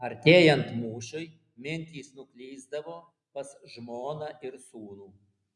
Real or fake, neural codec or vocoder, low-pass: real; none; 10.8 kHz